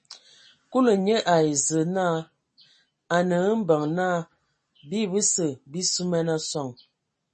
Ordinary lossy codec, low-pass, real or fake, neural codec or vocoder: MP3, 32 kbps; 9.9 kHz; real; none